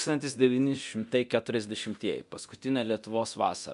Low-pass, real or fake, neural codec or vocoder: 10.8 kHz; fake; codec, 24 kHz, 0.9 kbps, DualCodec